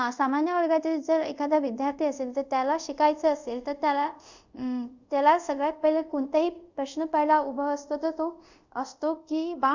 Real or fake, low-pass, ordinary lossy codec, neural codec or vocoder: fake; 7.2 kHz; Opus, 64 kbps; codec, 24 kHz, 0.5 kbps, DualCodec